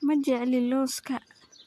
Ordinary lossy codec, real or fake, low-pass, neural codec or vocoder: AAC, 64 kbps; real; 14.4 kHz; none